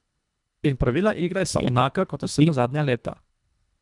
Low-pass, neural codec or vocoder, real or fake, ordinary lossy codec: 10.8 kHz; codec, 24 kHz, 1.5 kbps, HILCodec; fake; none